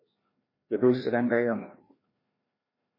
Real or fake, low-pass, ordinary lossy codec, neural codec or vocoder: fake; 5.4 kHz; MP3, 24 kbps; codec, 16 kHz, 1 kbps, FreqCodec, larger model